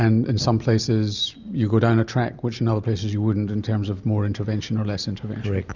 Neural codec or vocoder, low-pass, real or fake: none; 7.2 kHz; real